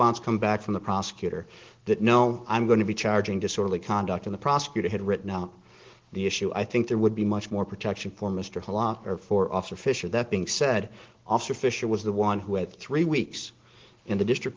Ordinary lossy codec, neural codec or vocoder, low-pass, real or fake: Opus, 16 kbps; none; 7.2 kHz; real